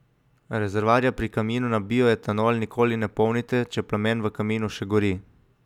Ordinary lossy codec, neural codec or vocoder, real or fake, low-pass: none; none; real; 19.8 kHz